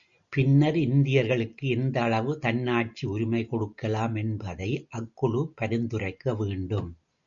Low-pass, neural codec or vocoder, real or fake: 7.2 kHz; none; real